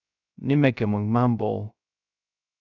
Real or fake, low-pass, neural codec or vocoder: fake; 7.2 kHz; codec, 16 kHz, 0.3 kbps, FocalCodec